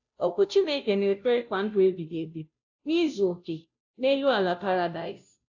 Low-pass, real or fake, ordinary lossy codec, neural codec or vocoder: 7.2 kHz; fake; AAC, 48 kbps; codec, 16 kHz, 0.5 kbps, FunCodec, trained on Chinese and English, 25 frames a second